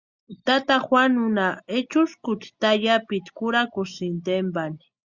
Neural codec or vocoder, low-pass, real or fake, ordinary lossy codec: none; 7.2 kHz; real; Opus, 64 kbps